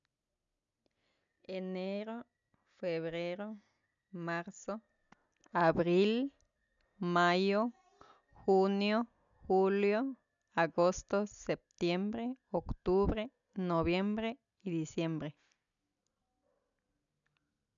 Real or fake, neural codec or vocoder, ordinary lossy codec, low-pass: real; none; none; 7.2 kHz